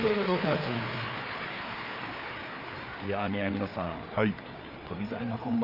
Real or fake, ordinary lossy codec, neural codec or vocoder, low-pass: fake; none; codec, 16 kHz, 4 kbps, FreqCodec, larger model; 5.4 kHz